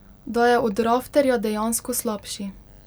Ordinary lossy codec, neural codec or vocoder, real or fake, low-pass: none; none; real; none